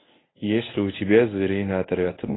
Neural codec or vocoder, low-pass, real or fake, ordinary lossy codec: codec, 24 kHz, 0.9 kbps, WavTokenizer, medium speech release version 1; 7.2 kHz; fake; AAC, 16 kbps